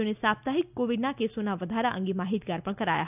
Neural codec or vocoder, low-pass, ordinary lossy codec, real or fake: none; 3.6 kHz; none; real